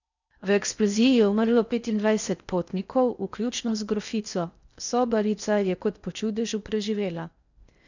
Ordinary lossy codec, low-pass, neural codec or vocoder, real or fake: none; 7.2 kHz; codec, 16 kHz in and 24 kHz out, 0.6 kbps, FocalCodec, streaming, 4096 codes; fake